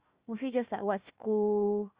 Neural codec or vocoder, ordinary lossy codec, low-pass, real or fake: codec, 16 kHz, 1 kbps, FunCodec, trained on Chinese and English, 50 frames a second; Opus, 64 kbps; 3.6 kHz; fake